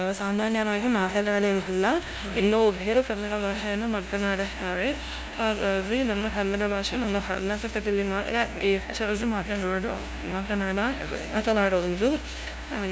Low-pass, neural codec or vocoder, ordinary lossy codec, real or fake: none; codec, 16 kHz, 0.5 kbps, FunCodec, trained on LibriTTS, 25 frames a second; none; fake